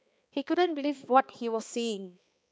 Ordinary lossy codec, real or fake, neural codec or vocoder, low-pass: none; fake; codec, 16 kHz, 2 kbps, X-Codec, HuBERT features, trained on balanced general audio; none